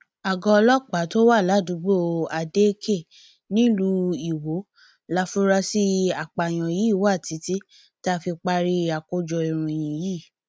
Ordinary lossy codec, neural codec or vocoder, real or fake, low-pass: none; none; real; none